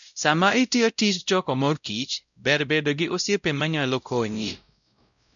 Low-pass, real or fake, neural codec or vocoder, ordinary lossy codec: 7.2 kHz; fake; codec, 16 kHz, 0.5 kbps, X-Codec, WavLM features, trained on Multilingual LibriSpeech; none